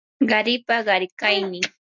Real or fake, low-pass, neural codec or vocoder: real; 7.2 kHz; none